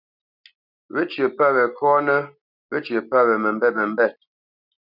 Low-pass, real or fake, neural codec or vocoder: 5.4 kHz; real; none